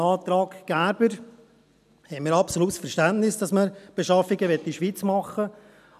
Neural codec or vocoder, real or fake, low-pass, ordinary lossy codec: none; real; 14.4 kHz; none